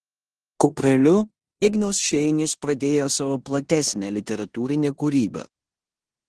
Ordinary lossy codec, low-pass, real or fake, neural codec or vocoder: Opus, 16 kbps; 10.8 kHz; fake; codec, 16 kHz in and 24 kHz out, 0.9 kbps, LongCat-Audio-Codec, four codebook decoder